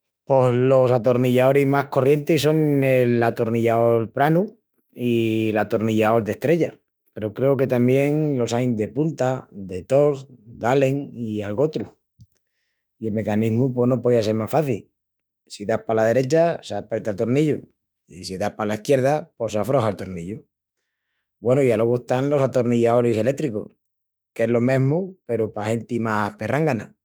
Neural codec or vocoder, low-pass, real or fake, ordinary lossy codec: autoencoder, 48 kHz, 32 numbers a frame, DAC-VAE, trained on Japanese speech; none; fake; none